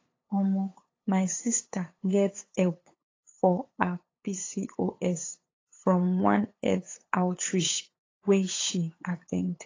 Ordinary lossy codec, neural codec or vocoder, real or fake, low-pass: AAC, 32 kbps; codec, 16 kHz, 8 kbps, FunCodec, trained on LibriTTS, 25 frames a second; fake; 7.2 kHz